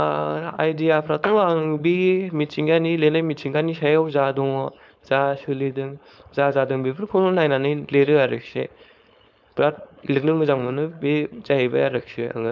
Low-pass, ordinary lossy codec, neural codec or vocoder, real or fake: none; none; codec, 16 kHz, 4.8 kbps, FACodec; fake